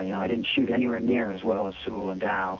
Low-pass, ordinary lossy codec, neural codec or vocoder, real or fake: 7.2 kHz; Opus, 32 kbps; vocoder, 24 kHz, 100 mel bands, Vocos; fake